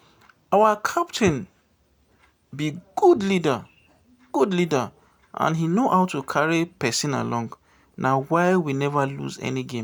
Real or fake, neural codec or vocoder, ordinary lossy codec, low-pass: real; none; none; none